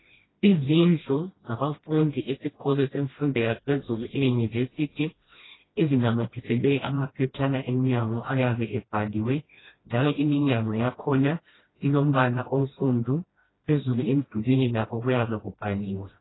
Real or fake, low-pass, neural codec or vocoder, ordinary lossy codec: fake; 7.2 kHz; codec, 16 kHz, 1 kbps, FreqCodec, smaller model; AAC, 16 kbps